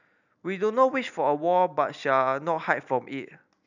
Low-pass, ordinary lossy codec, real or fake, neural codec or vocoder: 7.2 kHz; none; real; none